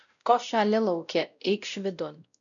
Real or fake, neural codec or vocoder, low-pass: fake; codec, 16 kHz, 0.5 kbps, X-Codec, WavLM features, trained on Multilingual LibriSpeech; 7.2 kHz